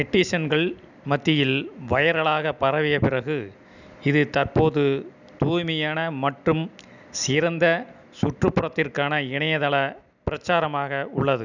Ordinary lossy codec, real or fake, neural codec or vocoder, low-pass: none; real; none; 7.2 kHz